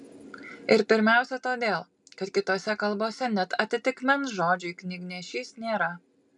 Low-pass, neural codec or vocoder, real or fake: 10.8 kHz; none; real